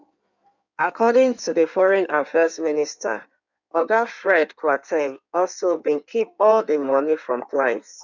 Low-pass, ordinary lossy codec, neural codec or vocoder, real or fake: 7.2 kHz; none; codec, 16 kHz in and 24 kHz out, 1.1 kbps, FireRedTTS-2 codec; fake